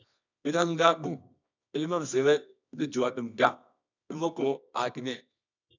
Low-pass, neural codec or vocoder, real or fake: 7.2 kHz; codec, 24 kHz, 0.9 kbps, WavTokenizer, medium music audio release; fake